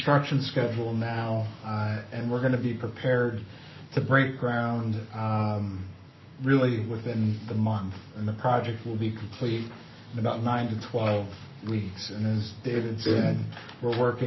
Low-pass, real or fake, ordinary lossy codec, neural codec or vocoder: 7.2 kHz; fake; MP3, 24 kbps; codec, 44.1 kHz, 7.8 kbps, Pupu-Codec